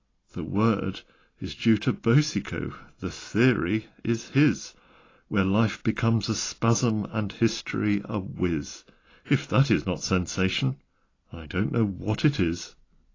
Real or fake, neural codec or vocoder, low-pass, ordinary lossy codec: real; none; 7.2 kHz; AAC, 32 kbps